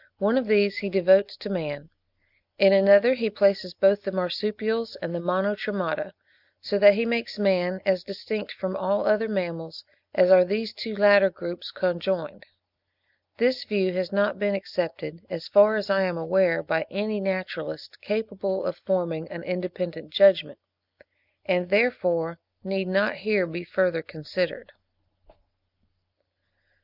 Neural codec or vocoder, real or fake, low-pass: none; real; 5.4 kHz